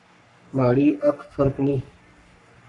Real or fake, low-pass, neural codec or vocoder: fake; 10.8 kHz; codec, 44.1 kHz, 3.4 kbps, Pupu-Codec